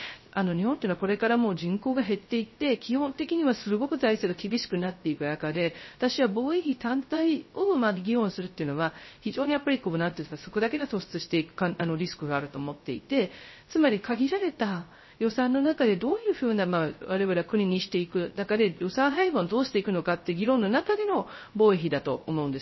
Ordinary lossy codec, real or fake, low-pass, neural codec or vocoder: MP3, 24 kbps; fake; 7.2 kHz; codec, 16 kHz, 0.3 kbps, FocalCodec